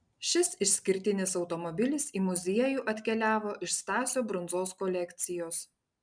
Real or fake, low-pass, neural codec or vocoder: real; 9.9 kHz; none